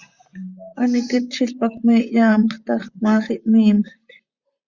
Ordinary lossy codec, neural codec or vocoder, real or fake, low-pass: Opus, 64 kbps; vocoder, 44.1 kHz, 128 mel bands, Pupu-Vocoder; fake; 7.2 kHz